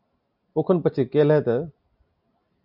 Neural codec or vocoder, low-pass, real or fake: none; 5.4 kHz; real